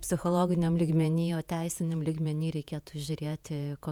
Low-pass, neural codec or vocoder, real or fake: 19.8 kHz; vocoder, 48 kHz, 128 mel bands, Vocos; fake